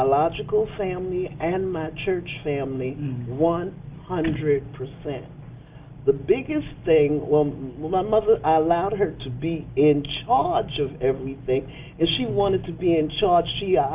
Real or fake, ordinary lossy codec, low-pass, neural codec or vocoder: real; Opus, 24 kbps; 3.6 kHz; none